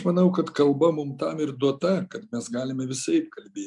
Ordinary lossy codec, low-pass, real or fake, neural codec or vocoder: MP3, 96 kbps; 10.8 kHz; real; none